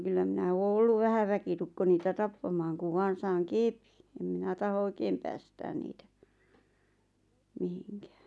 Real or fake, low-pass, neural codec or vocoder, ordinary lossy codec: real; none; none; none